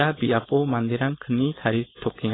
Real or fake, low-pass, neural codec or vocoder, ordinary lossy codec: fake; 7.2 kHz; codec, 16 kHz, 4.8 kbps, FACodec; AAC, 16 kbps